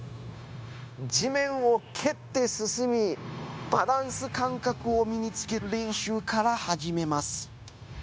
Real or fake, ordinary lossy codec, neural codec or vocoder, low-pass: fake; none; codec, 16 kHz, 0.9 kbps, LongCat-Audio-Codec; none